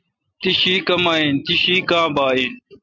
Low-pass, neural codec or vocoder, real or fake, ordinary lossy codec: 7.2 kHz; none; real; MP3, 48 kbps